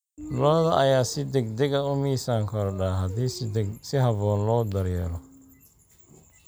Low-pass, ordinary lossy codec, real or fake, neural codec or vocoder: none; none; real; none